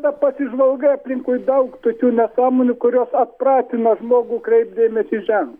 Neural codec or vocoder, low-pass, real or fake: none; 19.8 kHz; real